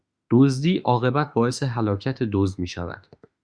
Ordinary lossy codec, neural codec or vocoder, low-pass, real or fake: Opus, 64 kbps; autoencoder, 48 kHz, 32 numbers a frame, DAC-VAE, trained on Japanese speech; 9.9 kHz; fake